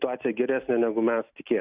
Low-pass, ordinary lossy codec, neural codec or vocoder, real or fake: 3.6 kHz; Opus, 64 kbps; none; real